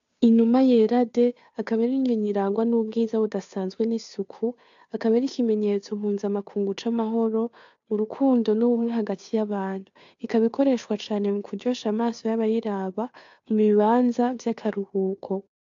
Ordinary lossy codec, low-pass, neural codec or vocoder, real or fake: AAC, 64 kbps; 7.2 kHz; codec, 16 kHz, 2 kbps, FunCodec, trained on Chinese and English, 25 frames a second; fake